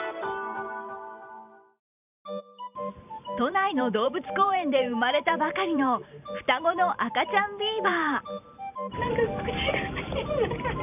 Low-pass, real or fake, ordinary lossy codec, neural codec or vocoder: 3.6 kHz; fake; none; vocoder, 44.1 kHz, 128 mel bands every 512 samples, BigVGAN v2